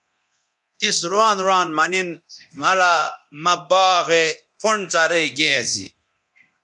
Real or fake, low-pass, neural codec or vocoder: fake; 10.8 kHz; codec, 24 kHz, 0.9 kbps, DualCodec